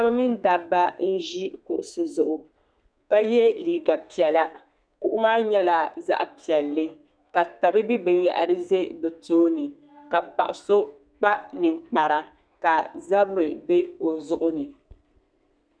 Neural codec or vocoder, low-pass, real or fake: codec, 32 kHz, 1.9 kbps, SNAC; 9.9 kHz; fake